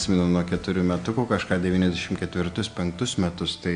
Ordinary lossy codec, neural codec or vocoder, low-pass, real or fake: AAC, 64 kbps; none; 9.9 kHz; real